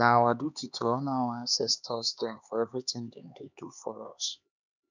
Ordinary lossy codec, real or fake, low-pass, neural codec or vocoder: none; fake; 7.2 kHz; codec, 16 kHz, 2 kbps, X-Codec, HuBERT features, trained on LibriSpeech